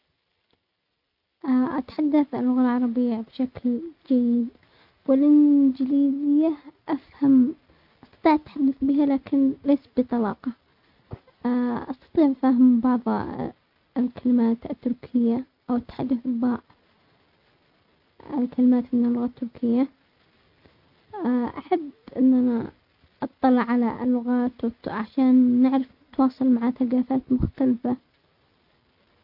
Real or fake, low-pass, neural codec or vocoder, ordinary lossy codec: real; 5.4 kHz; none; none